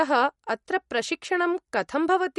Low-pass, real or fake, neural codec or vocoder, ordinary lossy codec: 19.8 kHz; real; none; MP3, 48 kbps